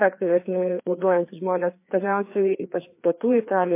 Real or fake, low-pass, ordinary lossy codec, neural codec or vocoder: fake; 3.6 kHz; MP3, 24 kbps; codec, 16 kHz, 2 kbps, FreqCodec, larger model